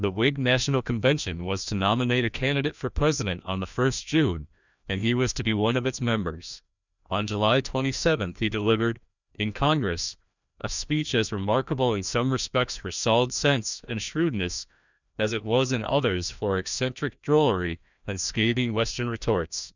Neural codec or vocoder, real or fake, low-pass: codec, 16 kHz, 1 kbps, FreqCodec, larger model; fake; 7.2 kHz